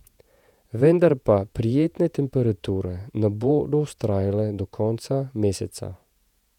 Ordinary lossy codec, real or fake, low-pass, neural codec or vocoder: none; fake; 19.8 kHz; vocoder, 48 kHz, 128 mel bands, Vocos